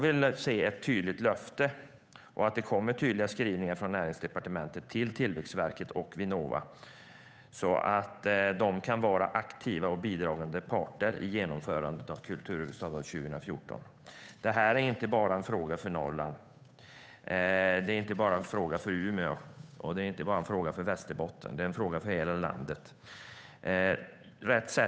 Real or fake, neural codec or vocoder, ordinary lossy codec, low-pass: fake; codec, 16 kHz, 8 kbps, FunCodec, trained on Chinese and English, 25 frames a second; none; none